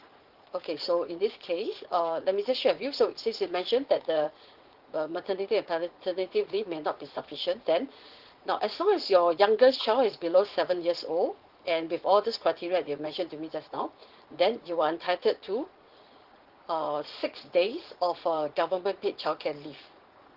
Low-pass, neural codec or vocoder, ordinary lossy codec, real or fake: 5.4 kHz; vocoder, 44.1 kHz, 80 mel bands, Vocos; Opus, 16 kbps; fake